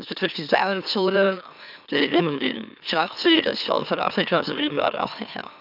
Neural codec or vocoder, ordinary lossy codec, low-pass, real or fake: autoencoder, 44.1 kHz, a latent of 192 numbers a frame, MeloTTS; none; 5.4 kHz; fake